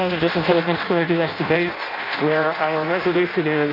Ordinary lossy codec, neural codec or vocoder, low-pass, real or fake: AAC, 32 kbps; codec, 16 kHz in and 24 kHz out, 0.6 kbps, FireRedTTS-2 codec; 5.4 kHz; fake